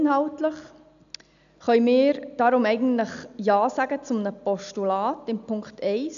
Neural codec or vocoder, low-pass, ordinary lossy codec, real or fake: none; 7.2 kHz; none; real